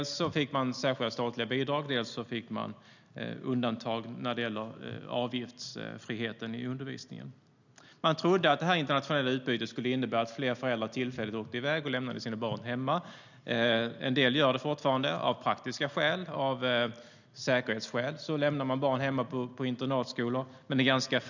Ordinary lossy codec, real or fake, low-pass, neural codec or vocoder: none; real; 7.2 kHz; none